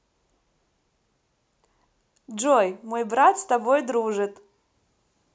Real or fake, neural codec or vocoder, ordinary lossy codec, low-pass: real; none; none; none